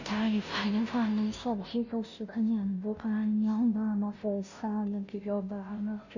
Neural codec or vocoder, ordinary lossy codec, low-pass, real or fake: codec, 16 kHz, 0.5 kbps, FunCodec, trained on Chinese and English, 25 frames a second; none; 7.2 kHz; fake